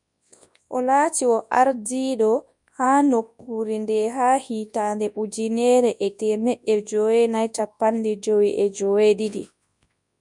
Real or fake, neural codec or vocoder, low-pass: fake; codec, 24 kHz, 0.9 kbps, WavTokenizer, large speech release; 10.8 kHz